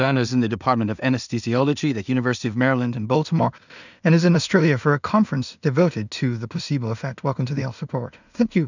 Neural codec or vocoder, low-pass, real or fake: codec, 16 kHz in and 24 kHz out, 0.4 kbps, LongCat-Audio-Codec, two codebook decoder; 7.2 kHz; fake